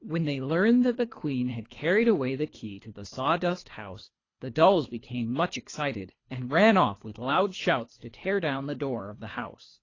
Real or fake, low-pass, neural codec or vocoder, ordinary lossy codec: fake; 7.2 kHz; codec, 24 kHz, 3 kbps, HILCodec; AAC, 32 kbps